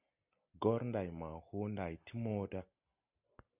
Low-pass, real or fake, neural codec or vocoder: 3.6 kHz; real; none